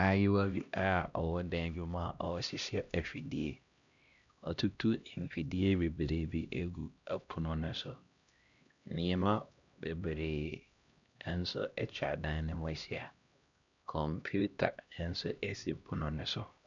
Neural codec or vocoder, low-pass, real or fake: codec, 16 kHz, 1 kbps, X-Codec, HuBERT features, trained on LibriSpeech; 7.2 kHz; fake